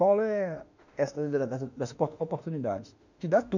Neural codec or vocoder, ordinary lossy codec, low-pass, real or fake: autoencoder, 48 kHz, 32 numbers a frame, DAC-VAE, trained on Japanese speech; none; 7.2 kHz; fake